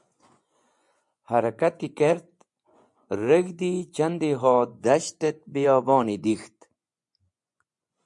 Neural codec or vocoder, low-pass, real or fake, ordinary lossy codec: vocoder, 24 kHz, 100 mel bands, Vocos; 10.8 kHz; fake; MP3, 96 kbps